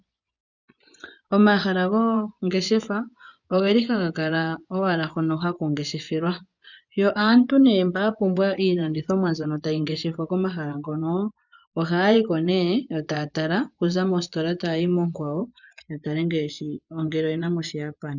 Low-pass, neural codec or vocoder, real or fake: 7.2 kHz; vocoder, 24 kHz, 100 mel bands, Vocos; fake